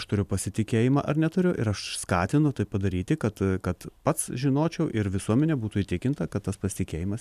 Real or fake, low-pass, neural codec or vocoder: real; 14.4 kHz; none